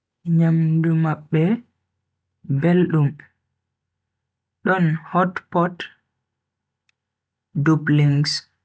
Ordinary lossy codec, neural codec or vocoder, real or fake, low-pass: none; none; real; none